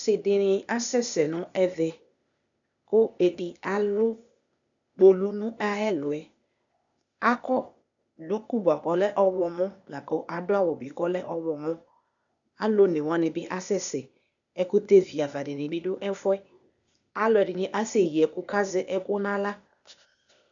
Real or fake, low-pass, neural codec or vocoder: fake; 7.2 kHz; codec, 16 kHz, 0.8 kbps, ZipCodec